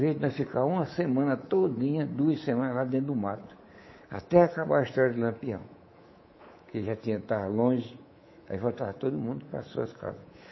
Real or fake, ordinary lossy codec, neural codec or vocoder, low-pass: fake; MP3, 24 kbps; codec, 24 kHz, 3.1 kbps, DualCodec; 7.2 kHz